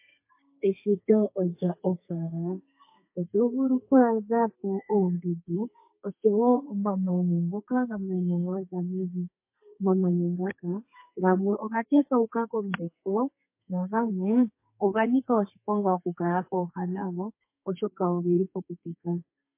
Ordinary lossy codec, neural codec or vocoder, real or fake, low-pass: MP3, 24 kbps; codec, 32 kHz, 1.9 kbps, SNAC; fake; 3.6 kHz